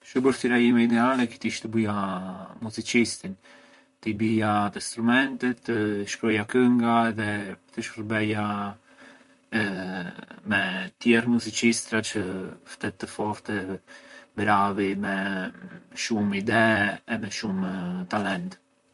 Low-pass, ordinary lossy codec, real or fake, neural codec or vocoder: 14.4 kHz; MP3, 48 kbps; fake; vocoder, 44.1 kHz, 128 mel bands, Pupu-Vocoder